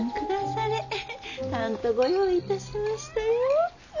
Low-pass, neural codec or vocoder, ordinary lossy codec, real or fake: 7.2 kHz; none; none; real